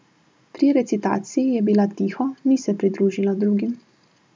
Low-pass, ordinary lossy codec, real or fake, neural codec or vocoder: none; none; real; none